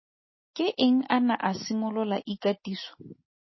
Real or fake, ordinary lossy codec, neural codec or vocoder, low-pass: real; MP3, 24 kbps; none; 7.2 kHz